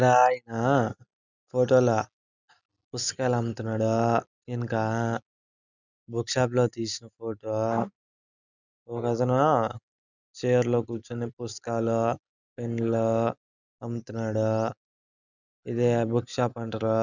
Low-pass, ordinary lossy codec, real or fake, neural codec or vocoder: 7.2 kHz; none; real; none